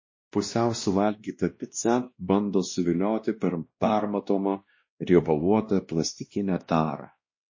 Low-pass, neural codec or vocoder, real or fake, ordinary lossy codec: 7.2 kHz; codec, 16 kHz, 1 kbps, X-Codec, WavLM features, trained on Multilingual LibriSpeech; fake; MP3, 32 kbps